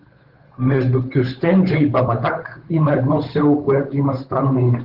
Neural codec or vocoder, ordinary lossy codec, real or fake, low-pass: codec, 16 kHz, 8 kbps, FunCodec, trained on Chinese and English, 25 frames a second; Opus, 16 kbps; fake; 5.4 kHz